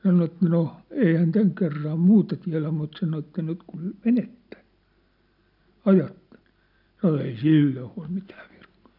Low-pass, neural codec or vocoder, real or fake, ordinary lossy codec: 5.4 kHz; none; real; none